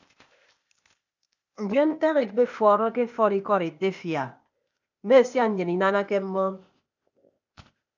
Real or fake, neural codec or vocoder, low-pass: fake; codec, 16 kHz, 0.8 kbps, ZipCodec; 7.2 kHz